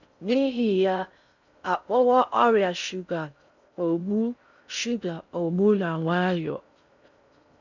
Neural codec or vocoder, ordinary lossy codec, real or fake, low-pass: codec, 16 kHz in and 24 kHz out, 0.6 kbps, FocalCodec, streaming, 4096 codes; none; fake; 7.2 kHz